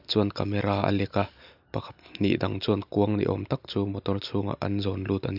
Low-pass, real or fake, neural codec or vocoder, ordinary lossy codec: 5.4 kHz; real; none; none